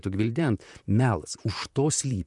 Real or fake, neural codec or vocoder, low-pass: fake; vocoder, 44.1 kHz, 128 mel bands, Pupu-Vocoder; 10.8 kHz